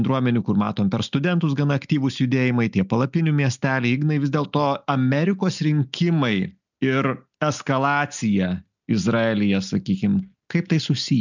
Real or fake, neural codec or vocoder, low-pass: real; none; 7.2 kHz